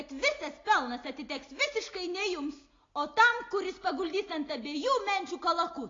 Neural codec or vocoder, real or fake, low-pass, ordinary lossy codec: none; real; 7.2 kHz; AAC, 32 kbps